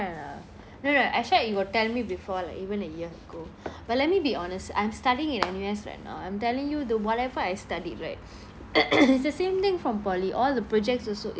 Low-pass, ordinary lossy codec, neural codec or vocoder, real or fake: none; none; none; real